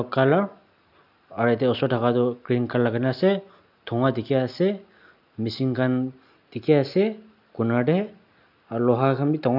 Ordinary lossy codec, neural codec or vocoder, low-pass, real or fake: none; none; 5.4 kHz; real